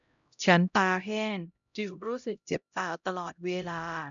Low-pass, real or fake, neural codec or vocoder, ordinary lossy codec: 7.2 kHz; fake; codec, 16 kHz, 0.5 kbps, X-Codec, HuBERT features, trained on LibriSpeech; none